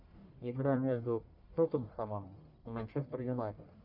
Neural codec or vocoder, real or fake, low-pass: codec, 44.1 kHz, 1.7 kbps, Pupu-Codec; fake; 5.4 kHz